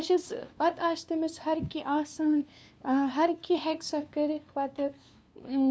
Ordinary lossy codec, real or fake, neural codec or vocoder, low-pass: none; fake; codec, 16 kHz, 2 kbps, FunCodec, trained on LibriTTS, 25 frames a second; none